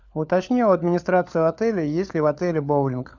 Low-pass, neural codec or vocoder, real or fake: 7.2 kHz; codec, 16 kHz, 4 kbps, FunCodec, trained on LibriTTS, 50 frames a second; fake